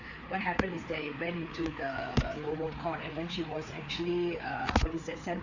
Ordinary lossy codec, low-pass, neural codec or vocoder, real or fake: Opus, 64 kbps; 7.2 kHz; codec, 16 kHz, 4 kbps, FreqCodec, larger model; fake